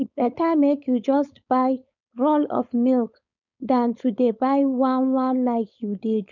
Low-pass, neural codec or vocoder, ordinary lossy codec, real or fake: 7.2 kHz; codec, 16 kHz, 4.8 kbps, FACodec; none; fake